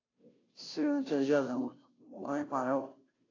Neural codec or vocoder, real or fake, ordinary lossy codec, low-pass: codec, 16 kHz, 0.5 kbps, FunCodec, trained on Chinese and English, 25 frames a second; fake; AAC, 32 kbps; 7.2 kHz